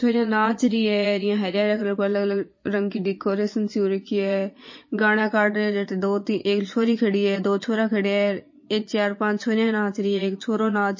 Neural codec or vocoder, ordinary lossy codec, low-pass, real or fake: vocoder, 22.05 kHz, 80 mel bands, Vocos; MP3, 32 kbps; 7.2 kHz; fake